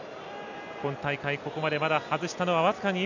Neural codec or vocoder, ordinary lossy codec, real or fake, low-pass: none; none; real; 7.2 kHz